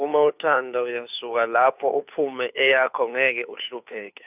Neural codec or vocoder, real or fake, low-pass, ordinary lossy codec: codec, 16 kHz, 2 kbps, FunCodec, trained on Chinese and English, 25 frames a second; fake; 3.6 kHz; none